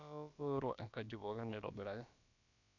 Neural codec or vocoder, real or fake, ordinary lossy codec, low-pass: codec, 16 kHz, about 1 kbps, DyCAST, with the encoder's durations; fake; none; 7.2 kHz